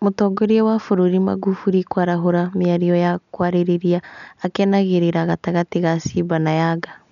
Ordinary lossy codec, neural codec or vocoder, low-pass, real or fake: none; none; 7.2 kHz; real